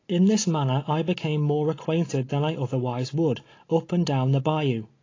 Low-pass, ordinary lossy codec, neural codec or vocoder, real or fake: 7.2 kHz; AAC, 32 kbps; none; real